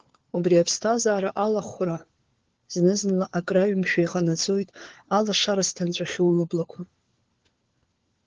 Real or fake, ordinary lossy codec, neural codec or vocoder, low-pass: fake; Opus, 16 kbps; codec, 16 kHz, 4 kbps, FunCodec, trained on LibriTTS, 50 frames a second; 7.2 kHz